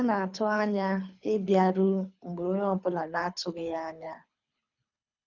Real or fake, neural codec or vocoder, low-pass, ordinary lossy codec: fake; codec, 24 kHz, 3 kbps, HILCodec; 7.2 kHz; none